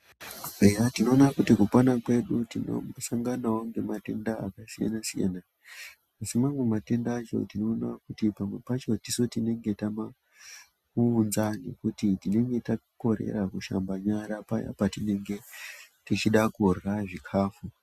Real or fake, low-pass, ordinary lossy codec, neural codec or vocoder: real; 14.4 kHz; Opus, 64 kbps; none